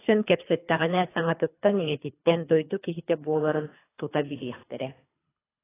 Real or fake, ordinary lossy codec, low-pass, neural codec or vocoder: fake; AAC, 16 kbps; 3.6 kHz; codec, 24 kHz, 3 kbps, HILCodec